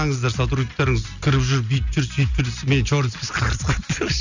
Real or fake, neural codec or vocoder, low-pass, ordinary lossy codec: real; none; 7.2 kHz; none